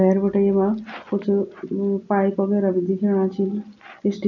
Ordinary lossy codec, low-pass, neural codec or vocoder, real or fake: none; 7.2 kHz; none; real